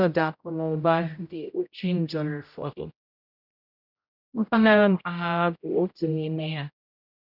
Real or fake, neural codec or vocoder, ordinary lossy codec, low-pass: fake; codec, 16 kHz, 0.5 kbps, X-Codec, HuBERT features, trained on general audio; none; 5.4 kHz